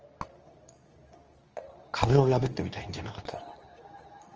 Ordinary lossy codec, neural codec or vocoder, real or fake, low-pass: Opus, 24 kbps; codec, 24 kHz, 0.9 kbps, WavTokenizer, medium speech release version 2; fake; 7.2 kHz